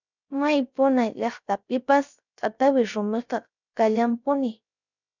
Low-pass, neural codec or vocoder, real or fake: 7.2 kHz; codec, 16 kHz, 0.3 kbps, FocalCodec; fake